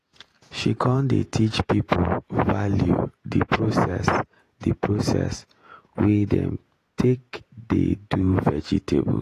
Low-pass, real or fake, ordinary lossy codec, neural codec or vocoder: 14.4 kHz; fake; AAC, 48 kbps; vocoder, 48 kHz, 128 mel bands, Vocos